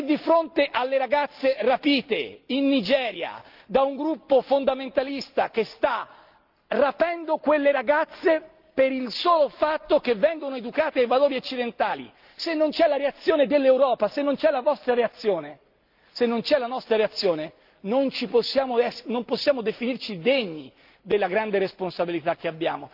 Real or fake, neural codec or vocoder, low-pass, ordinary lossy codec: real; none; 5.4 kHz; Opus, 32 kbps